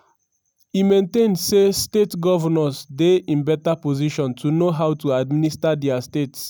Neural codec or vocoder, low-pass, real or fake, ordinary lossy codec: none; none; real; none